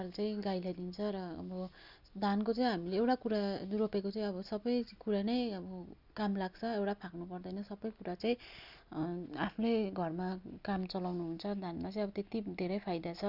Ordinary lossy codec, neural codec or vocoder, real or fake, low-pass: none; none; real; 5.4 kHz